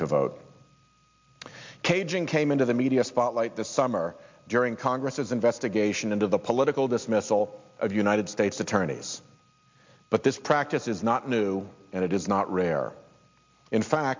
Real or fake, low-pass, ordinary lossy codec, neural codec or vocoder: real; 7.2 kHz; MP3, 64 kbps; none